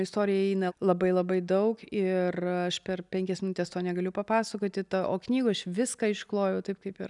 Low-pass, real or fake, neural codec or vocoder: 10.8 kHz; real; none